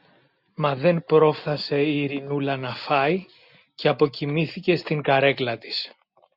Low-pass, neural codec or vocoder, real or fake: 5.4 kHz; none; real